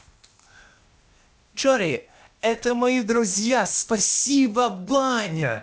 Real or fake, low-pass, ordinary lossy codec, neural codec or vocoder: fake; none; none; codec, 16 kHz, 0.8 kbps, ZipCodec